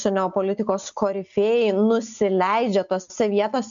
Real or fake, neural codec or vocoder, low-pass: real; none; 7.2 kHz